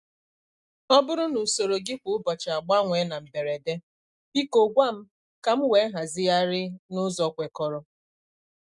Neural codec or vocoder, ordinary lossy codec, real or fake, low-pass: none; none; real; 10.8 kHz